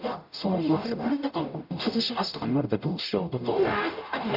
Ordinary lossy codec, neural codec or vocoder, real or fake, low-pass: none; codec, 44.1 kHz, 0.9 kbps, DAC; fake; 5.4 kHz